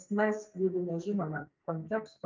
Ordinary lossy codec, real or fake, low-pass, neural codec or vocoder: Opus, 24 kbps; fake; 7.2 kHz; codec, 44.1 kHz, 2.6 kbps, SNAC